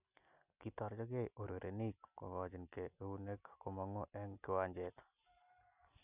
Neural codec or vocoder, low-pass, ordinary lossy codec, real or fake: none; 3.6 kHz; none; real